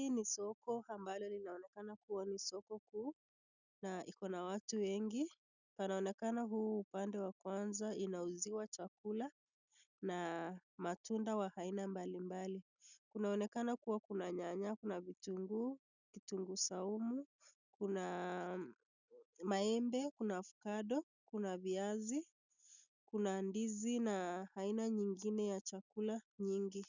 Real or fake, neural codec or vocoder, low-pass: real; none; 7.2 kHz